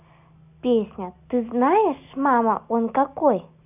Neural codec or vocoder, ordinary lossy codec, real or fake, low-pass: none; none; real; 3.6 kHz